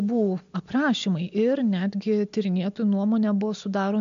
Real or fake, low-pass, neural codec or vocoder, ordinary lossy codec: real; 7.2 kHz; none; MP3, 64 kbps